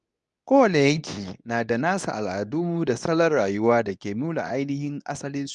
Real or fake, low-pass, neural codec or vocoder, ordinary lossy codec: fake; 10.8 kHz; codec, 24 kHz, 0.9 kbps, WavTokenizer, medium speech release version 2; none